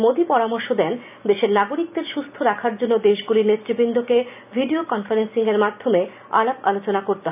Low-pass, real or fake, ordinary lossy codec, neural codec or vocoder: 3.6 kHz; real; none; none